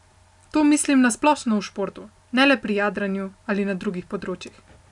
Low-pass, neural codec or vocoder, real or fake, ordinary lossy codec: 10.8 kHz; none; real; none